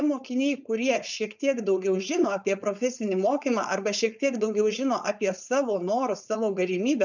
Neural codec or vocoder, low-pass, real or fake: codec, 16 kHz, 4.8 kbps, FACodec; 7.2 kHz; fake